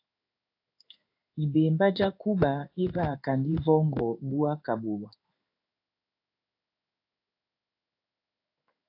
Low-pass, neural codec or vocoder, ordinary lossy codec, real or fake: 5.4 kHz; codec, 16 kHz in and 24 kHz out, 1 kbps, XY-Tokenizer; AAC, 32 kbps; fake